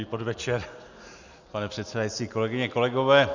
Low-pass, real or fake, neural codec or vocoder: 7.2 kHz; real; none